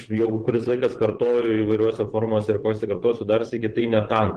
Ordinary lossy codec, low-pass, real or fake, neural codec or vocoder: Opus, 16 kbps; 9.9 kHz; fake; vocoder, 22.05 kHz, 80 mel bands, WaveNeXt